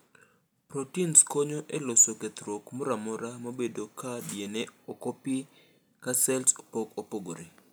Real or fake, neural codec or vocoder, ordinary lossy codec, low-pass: real; none; none; none